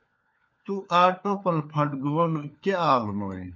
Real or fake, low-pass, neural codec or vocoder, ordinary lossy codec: fake; 7.2 kHz; codec, 16 kHz, 4 kbps, FunCodec, trained on LibriTTS, 50 frames a second; MP3, 48 kbps